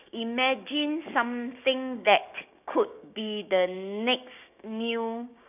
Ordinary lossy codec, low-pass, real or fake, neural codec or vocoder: none; 3.6 kHz; fake; codec, 16 kHz, 6 kbps, DAC